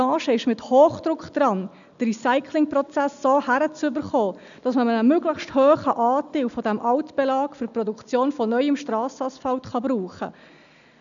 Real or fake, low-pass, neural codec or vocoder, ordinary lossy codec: real; 7.2 kHz; none; none